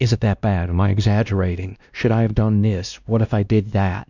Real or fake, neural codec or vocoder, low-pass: fake; codec, 16 kHz, 1 kbps, X-Codec, WavLM features, trained on Multilingual LibriSpeech; 7.2 kHz